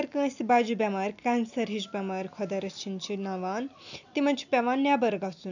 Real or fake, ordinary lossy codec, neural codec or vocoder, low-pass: real; none; none; 7.2 kHz